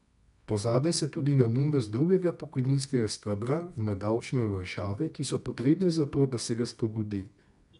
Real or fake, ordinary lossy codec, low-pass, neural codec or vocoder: fake; none; 10.8 kHz; codec, 24 kHz, 0.9 kbps, WavTokenizer, medium music audio release